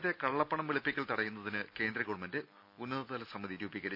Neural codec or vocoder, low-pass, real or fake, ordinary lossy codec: none; 5.4 kHz; real; none